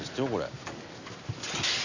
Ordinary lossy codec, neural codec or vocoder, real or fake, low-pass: none; none; real; 7.2 kHz